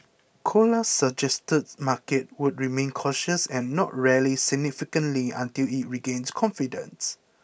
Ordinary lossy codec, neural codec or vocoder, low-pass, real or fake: none; none; none; real